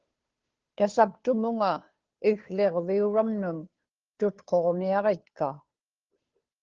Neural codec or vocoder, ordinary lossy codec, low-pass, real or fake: codec, 16 kHz, 2 kbps, FunCodec, trained on Chinese and English, 25 frames a second; Opus, 16 kbps; 7.2 kHz; fake